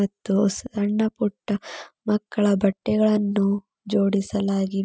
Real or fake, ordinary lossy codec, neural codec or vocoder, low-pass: real; none; none; none